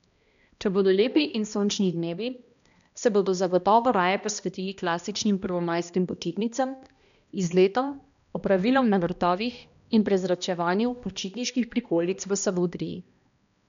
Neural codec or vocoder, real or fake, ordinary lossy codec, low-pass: codec, 16 kHz, 1 kbps, X-Codec, HuBERT features, trained on balanced general audio; fake; none; 7.2 kHz